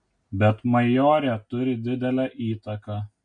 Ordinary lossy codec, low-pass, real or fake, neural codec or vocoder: MP3, 48 kbps; 9.9 kHz; real; none